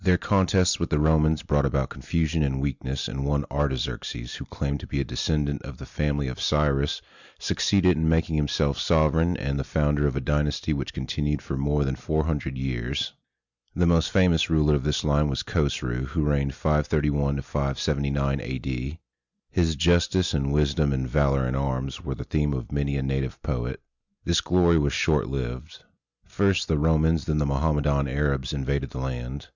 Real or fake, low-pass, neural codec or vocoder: real; 7.2 kHz; none